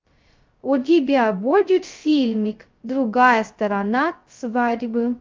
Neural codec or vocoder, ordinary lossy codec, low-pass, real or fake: codec, 16 kHz, 0.2 kbps, FocalCodec; Opus, 24 kbps; 7.2 kHz; fake